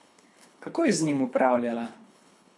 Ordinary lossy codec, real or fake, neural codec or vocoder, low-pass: none; fake; codec, 24 kHz, 3 kbps, HILCodec; none